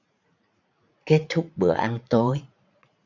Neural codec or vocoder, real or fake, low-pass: vocoder, 44.1 kHz, 80 mel bands, Vocos; fake; 7.2 kHz